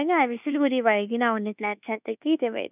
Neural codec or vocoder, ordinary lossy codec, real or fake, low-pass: codec, 24 kHz, 1.2 kbps, DualCodec; none; fake; 3.6 kHz